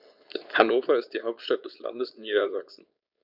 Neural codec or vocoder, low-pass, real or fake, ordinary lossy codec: codec, 16 kHz, 4.8 kbps, FACodec; 5.4 kHz; fake; none